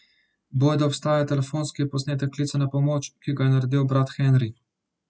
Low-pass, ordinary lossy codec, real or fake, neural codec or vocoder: none; none; real; none